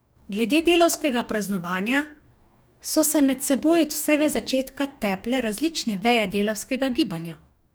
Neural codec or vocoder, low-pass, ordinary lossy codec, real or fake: codec, 44.1 kHz, 2.6 kbps, DAC; none; none; fake